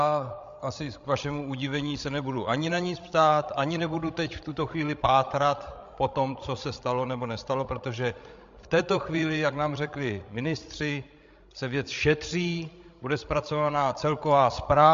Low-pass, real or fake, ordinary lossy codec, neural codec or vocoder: 7.2 kHz; fake; MP3, 48 kbps; codec, 16 kHz, 16 kbps, FreqCodec, larger model